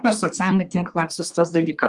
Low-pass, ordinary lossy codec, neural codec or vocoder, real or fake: 10.8 kHz; Opus, 32 kbps; codec, 24 kHz, 1 kbps, SNAC; fake